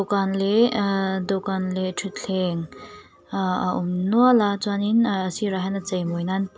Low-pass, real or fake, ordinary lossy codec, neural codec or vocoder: none; real; none; none